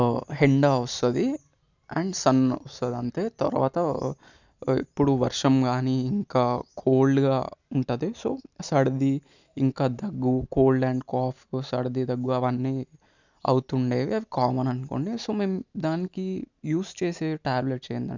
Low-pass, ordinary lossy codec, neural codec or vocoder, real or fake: 7.2 kHz; none; none; real